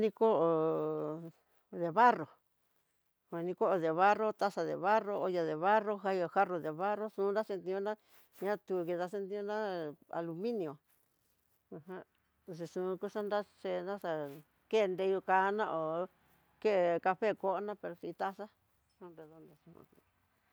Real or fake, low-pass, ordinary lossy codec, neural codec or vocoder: real; none; none; none